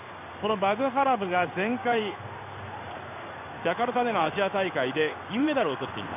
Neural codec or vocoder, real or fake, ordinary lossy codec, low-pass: codec, 16 kHz in and 24 kHz out, 1 kbps, XY-Tokenizer; fake; MP3, 32 kbps; 3.6 kHz